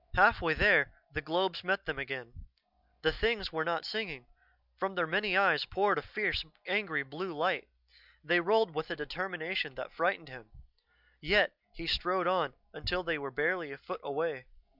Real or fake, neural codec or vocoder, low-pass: real; none; 5.4 kHz